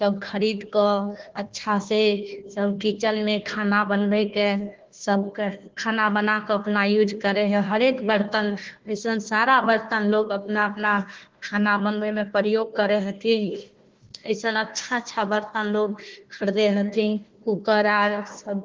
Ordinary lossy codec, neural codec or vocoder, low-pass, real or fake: Opus, 16 kbps; codec, 16 kHz, 1 kbps, FunCodec, trained on Chinese and English, 50 frames a second; 7.2 kHz; fake